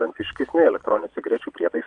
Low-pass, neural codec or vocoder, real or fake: 9.9 kHz; vocoder, 22.05 kHz, 80 mel bands, Vocos; fake